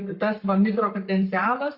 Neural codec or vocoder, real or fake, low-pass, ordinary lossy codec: codec, 44.1 kHz, 7.8 kbps, Pupu-Codec; fake; 5.4 kHz; Opus, 64 kbps